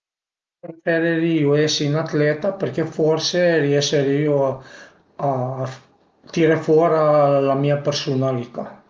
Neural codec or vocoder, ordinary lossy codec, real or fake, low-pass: none; Opus, 24 kbps; real; 7.2 kHz